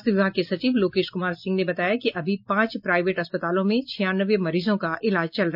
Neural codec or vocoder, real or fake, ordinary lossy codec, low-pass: none; real; MP3, 48 kbps; 5.4 kHz